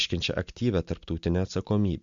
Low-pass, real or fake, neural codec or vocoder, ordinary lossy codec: 7.2 kHz; real; none; MP3, 64 kbps